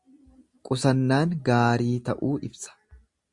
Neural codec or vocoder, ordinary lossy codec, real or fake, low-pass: none; Opus, 64 kbps; real; 10.8 kHz